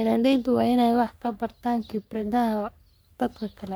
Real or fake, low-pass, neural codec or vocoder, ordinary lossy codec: fake; none; codec, 44.1 kHz, 3.4 kbps, Pupu-Codec; none